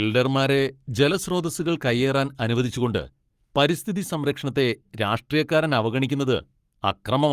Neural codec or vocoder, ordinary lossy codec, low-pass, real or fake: codec, 44.1 kHz, 7.8 kbps, Pupu-Codec; Opus, 32 kbps; 14.4 kHz; fake